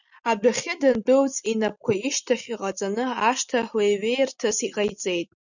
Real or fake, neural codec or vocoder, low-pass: real; none; 7.2 kHz